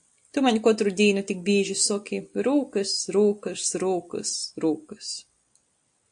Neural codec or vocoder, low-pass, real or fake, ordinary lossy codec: none; 9.9 kHz; real; AAC, 64 kbps